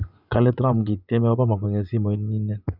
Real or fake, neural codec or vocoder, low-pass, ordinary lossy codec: fake; vocoder, 24 kHz, 100 mel bands, Vocos; 5.4 kHz; none